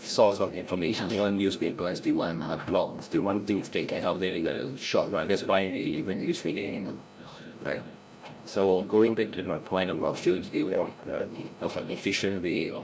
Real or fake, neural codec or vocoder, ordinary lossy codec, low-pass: fake; codec, 16 kHz, 0.5 kbps, FreqCodec, larger model; none; none